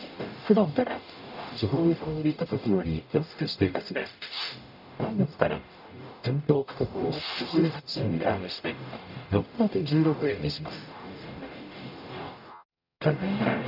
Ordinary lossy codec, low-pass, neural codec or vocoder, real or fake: none; 5.4 kHz; codec, 44.1 kHz, 0.9 kbps, DAC; fake